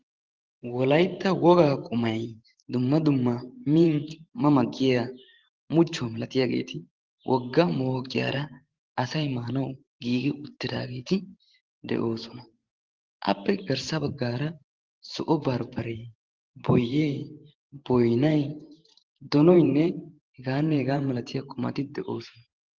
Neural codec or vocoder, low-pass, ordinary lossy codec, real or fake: vocoder, 44.1 kHz, 128 mel bands every 512 samples, BigVGAN v2; 7.2 kHz; Opus, 16 kbps; fake